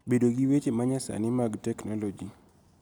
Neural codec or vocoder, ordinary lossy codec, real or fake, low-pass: none; none; real; none